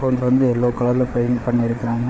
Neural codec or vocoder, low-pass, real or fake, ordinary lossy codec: codec, 16 kHz, 4 kbps, FreqCodec, larger model; none; fake; none